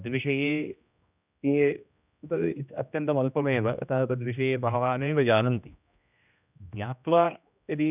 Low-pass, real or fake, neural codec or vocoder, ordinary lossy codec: 3.6 kHz; fake; codec, 16 kHz, 1 kbps, X-Codec, HuBERT features, trained on general audio; none